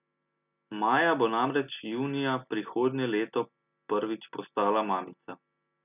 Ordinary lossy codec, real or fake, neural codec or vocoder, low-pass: none; real; none; 3.6 kHz